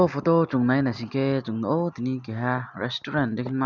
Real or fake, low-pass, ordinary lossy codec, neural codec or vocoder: real; 7.2 kHz; none; none